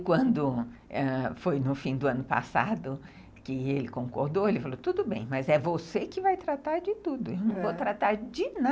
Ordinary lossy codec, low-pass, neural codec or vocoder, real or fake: none; none; none; real